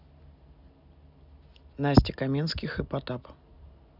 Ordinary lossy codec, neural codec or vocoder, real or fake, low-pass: none; none; real; 5.4 kHz